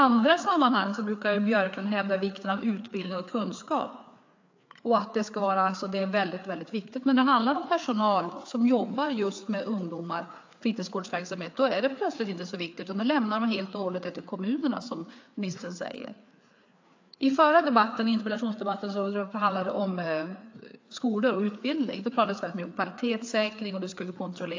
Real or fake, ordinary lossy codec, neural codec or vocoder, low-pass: fake; AAC, 48 kbps; codec, 16 kHz, 4 kbps, FreqCodec, larger model; 7.2 kHz